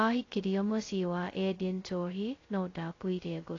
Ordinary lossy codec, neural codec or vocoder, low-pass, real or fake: AAC, 32 kbps; codec, 16 kHz, 0.2 kbps, FocalCodec; 7.2 kHz; fake